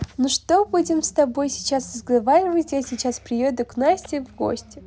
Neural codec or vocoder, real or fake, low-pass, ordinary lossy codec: none; real; none; none